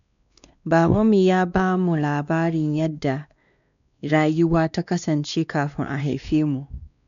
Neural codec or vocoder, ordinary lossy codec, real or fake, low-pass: codec, 16 kHz, 2 kbps, X-Codec, WavLM features, trained on Multilingual LibriSpeech; none; fake; 7.2 kHz